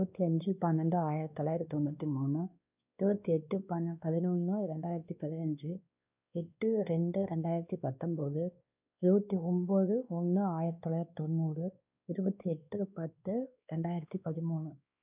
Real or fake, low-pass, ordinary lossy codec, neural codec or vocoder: fake; 3.6 kHz; none; codec, 16 kHz, 2 kbps, X-Codec, WavLM features, trained on Multilingual LibriSpeech